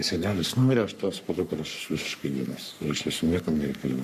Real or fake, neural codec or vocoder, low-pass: fake; codec, 44.1 kHz, 3.4 kbps, Pupu-Codec; 14.4 kHz